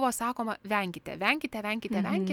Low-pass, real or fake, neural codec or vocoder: 19.8 kHz; real; none